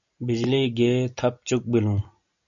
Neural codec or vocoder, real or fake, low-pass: none; real; 7.2 kHz